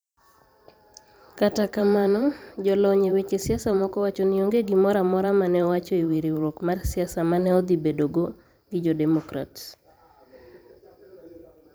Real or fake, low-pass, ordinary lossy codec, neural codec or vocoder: fake; none; none; vocoder, 44.1 kHz, 128 mel bands every 512 samples, BigVGAN v2